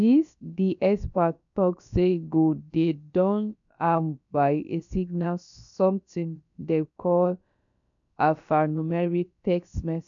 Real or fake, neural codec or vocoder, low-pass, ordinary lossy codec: fake; codec, 16 kHz, about 1 kbps, DyCAST, with the encoder's durations; 7.2 kHz; none